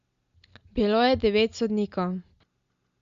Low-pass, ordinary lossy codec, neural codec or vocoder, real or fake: 7.2 kHz; Opus, 64 kbps; none; real